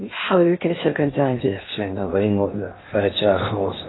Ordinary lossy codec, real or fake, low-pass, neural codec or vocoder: AAC, 16 kbps; fake; 7.2 kHz; codec, 16 kHz in and 24 kHz out, 0.6 kbps, FocalCodec, streaming, 2048 codes